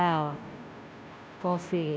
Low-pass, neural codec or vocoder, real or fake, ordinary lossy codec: none; codec, 16 kHz, 0.5 kbps, FunCodec, trained on Chinese and English, 25 frames a second; fake; none